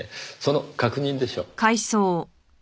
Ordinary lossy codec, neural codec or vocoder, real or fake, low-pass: none; none; real; none